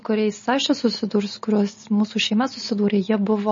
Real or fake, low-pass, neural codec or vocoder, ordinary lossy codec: real; 7.2 kHz; none; MP3, 32 kbps